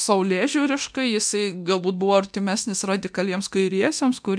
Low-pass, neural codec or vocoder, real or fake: 9.9 kHz; codec, 24 kHz, 0.9 kbps, DualCodec; fake